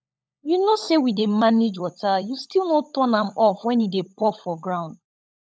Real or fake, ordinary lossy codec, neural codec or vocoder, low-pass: fake; none; codec, 16 kHz, 16 kbps, FunCodec, trained on LibriTTS, 50 frames a second; none